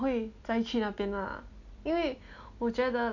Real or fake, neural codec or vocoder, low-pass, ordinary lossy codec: real; none; 7.2 kHz; none